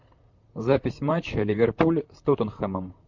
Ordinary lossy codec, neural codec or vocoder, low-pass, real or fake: MP3, 64 kbps; vocoder, 44.1 kHz, 128 mel bands, Pupu-Vocoder; 7.2 kHz; fake